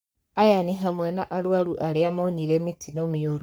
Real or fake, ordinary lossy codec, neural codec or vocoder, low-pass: fake; none; codec, 44.1 kHz, 3.4 kbps, Pupu-Codec; none